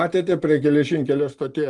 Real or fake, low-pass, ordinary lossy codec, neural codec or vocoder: real; 10.8 kHz; Opus, 32 kbps; none